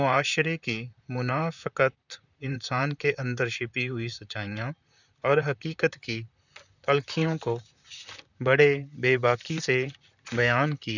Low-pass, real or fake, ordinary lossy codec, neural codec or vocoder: 7.2 kHz; fake; none; vocoder, 44.1 kHz, 128 mel bands, Pupu-Vocoder